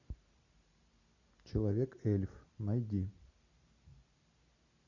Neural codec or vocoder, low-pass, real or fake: none; 7.2 kHz; real